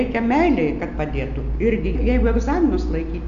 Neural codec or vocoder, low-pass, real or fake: none; 7.2 kHz; real